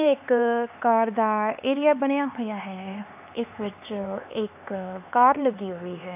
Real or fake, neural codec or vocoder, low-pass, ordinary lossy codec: fake; codec, 16 kHz, 4 kbps, X-Codec, HuBERT features, trained on LibriSpeech; 3.6 kHz; AAC, 32 kbps